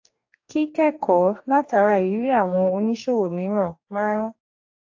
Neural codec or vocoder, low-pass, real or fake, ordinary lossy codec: codec, 44.1 kHz, 2.6 kbps, DAC; 7.2 kHz; fake; AAC, 48 kbps